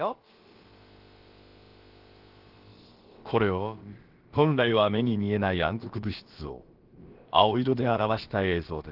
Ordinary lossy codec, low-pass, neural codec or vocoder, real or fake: Opus, 16 kbps; 5.4 kHz; codec, 16 kHz, about 1 kbps, DyCAST, with the encoder's durations; fake